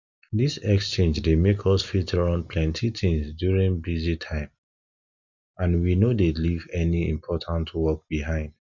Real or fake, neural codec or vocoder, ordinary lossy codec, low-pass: real; none; none; 7.2 kHz